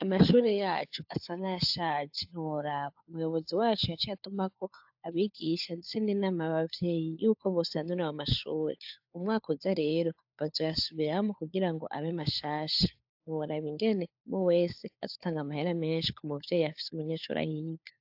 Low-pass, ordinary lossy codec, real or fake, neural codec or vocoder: 5.4 kHz; AAC, 48 kbps; fake; codec, 16 kHz, 4 kbps, FunCodec, trained on LibriTTS, 50 frames a second